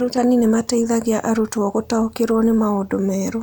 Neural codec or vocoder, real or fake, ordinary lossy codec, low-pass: none; real; none; none